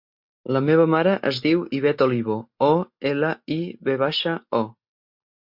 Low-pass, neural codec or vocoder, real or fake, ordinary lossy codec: 5.4 kHz; none; real; MP3, 48 kbps